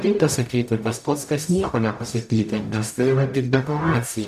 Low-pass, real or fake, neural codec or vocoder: 14.4 kHz; fake; codec, 44.1 kHz, 0.9 kbps, DAC